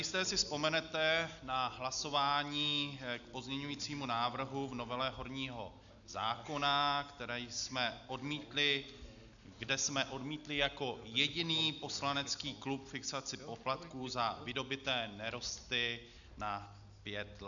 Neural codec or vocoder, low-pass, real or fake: none; 7.2 kHz; real